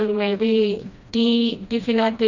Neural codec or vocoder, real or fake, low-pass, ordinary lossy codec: codec, 16 kHz, 1 kbps, FreqCodec, smaller model; fake; 7.2 kHz; Opus, 64 kbps